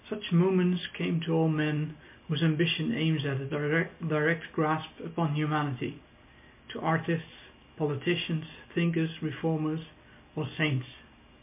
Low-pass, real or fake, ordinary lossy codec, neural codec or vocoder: 3.6 kHz; real; MP3, 32 kbps; none